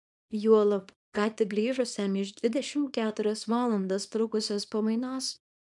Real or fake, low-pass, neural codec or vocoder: fake; 10.8 kHz; codec, 24 kHz, 0.9 kbps, WavTokenizer, small release